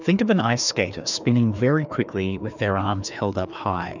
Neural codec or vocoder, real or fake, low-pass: codec, 16 kHz, 2 kbps, FreqCodec, larger model; fake; 7.2 kHz